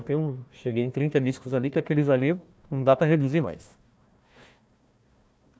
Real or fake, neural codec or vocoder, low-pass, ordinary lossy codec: fake; codec, 16 kHz, 1 kbps, FunCodec, trained on Chinese and English, 50 frames a second; none; none